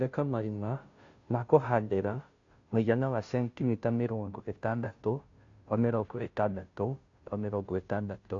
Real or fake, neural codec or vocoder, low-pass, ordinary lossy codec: fake; codec, 16 kHz, 0.5 kbps, FunCodec, trained on Chinese and English, 25 frames a second; 7.2 kHz; AAC, 48 kbps